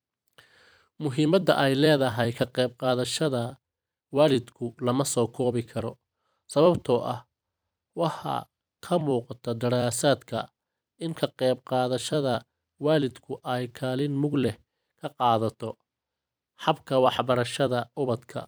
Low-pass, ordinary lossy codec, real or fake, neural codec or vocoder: none; none; fake; vocoder, 44.1 kHz, 128 mel bands every 256 samples, BigVGAN v2